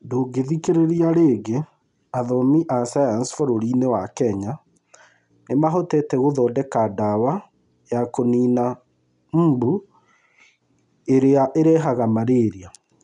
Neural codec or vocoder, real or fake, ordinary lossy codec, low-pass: none; real; none; 10.8 kHz